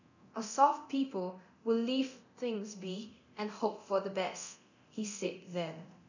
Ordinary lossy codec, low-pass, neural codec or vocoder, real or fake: none; 7.2 kHz; codec, 24 kHz, 0.9 kbps, DualCodec; fake